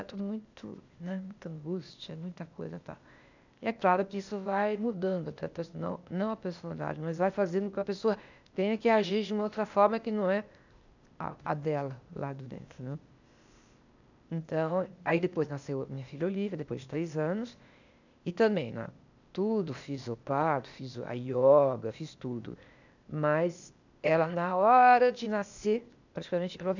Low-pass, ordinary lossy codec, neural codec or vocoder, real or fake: 7.2 kHz; none; codec, 16 kHz, 0.8 kbps, ZipCodec; fake